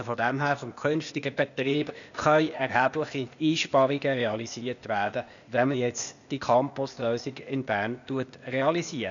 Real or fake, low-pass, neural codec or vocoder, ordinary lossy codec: fake; 7.2 kHz; codec, 16 kHz, 0.8 kbps, ZipCodec; none